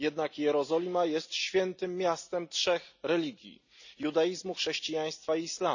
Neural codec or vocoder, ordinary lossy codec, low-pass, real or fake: none; none; 7.2 kHz; real